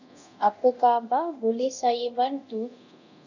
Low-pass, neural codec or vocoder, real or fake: 7.2 kHz; codec, 24 kHz, 0.9 kbps, DualCodec; fake